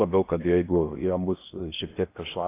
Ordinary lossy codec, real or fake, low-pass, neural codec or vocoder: AAC, 24 kbps; fake; 3.6 kHz; codec, 16 kHz in and 24 kHz out, 0.6 kbps, FocalCodec, streaming, 4096 codes